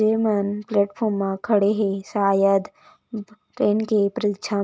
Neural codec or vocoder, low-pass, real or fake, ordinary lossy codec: none; none; real; none